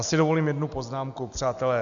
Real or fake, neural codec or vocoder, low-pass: real; none; 7.2 kHz